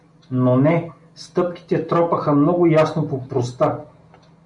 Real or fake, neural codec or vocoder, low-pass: real; none; 10.8 kHz